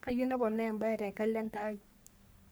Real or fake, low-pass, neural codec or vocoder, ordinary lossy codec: fake; none; codec, 44.1 kHz, 3.4 kbps, Pupu-Codec; none